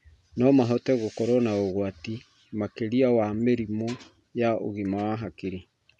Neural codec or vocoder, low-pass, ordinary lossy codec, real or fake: none; none; none; real